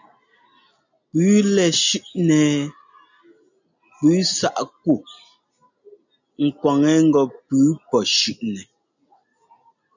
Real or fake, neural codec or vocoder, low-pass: real; none; 7.2 kHz